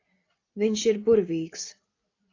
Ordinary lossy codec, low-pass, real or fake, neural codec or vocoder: AAC, 32 kbps; 7.2 kHz; real; none